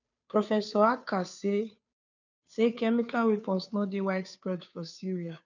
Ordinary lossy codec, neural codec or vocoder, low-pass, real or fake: none; codec, 16 kHz, 2 kbps, FunCodec, trained on Chinese and English, 25 frames a second; 7.2 kHz; fake